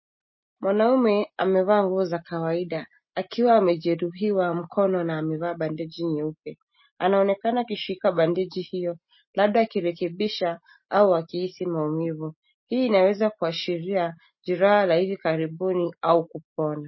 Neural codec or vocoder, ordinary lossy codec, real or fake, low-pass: none; MP3, 24 kbps; real; 7.2 kHz